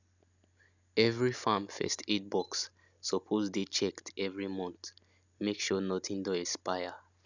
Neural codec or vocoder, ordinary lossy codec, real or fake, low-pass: none; none; real; 7.2 kHz